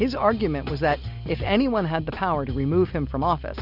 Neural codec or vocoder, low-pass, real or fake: none; 5.4 kHz; real